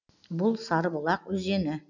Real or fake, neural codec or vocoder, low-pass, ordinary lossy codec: real; none; 7.2 kHz; none